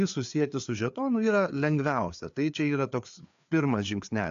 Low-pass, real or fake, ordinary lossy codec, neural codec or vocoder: 7.2 kHz; fake; AAC, 64 kbps; codec, 16 kHz, 4 kbps, FreqCodec, larger model